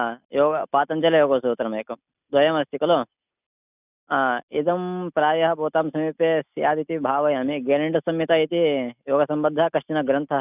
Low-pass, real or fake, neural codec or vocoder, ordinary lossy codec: 3.6 kHz; real; none; none